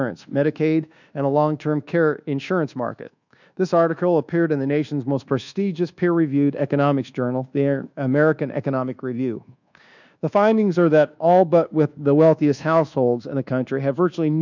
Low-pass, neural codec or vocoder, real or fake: 7.2 kHz; codec, 24 kHz, 1.2 kbps, DualCodec; fake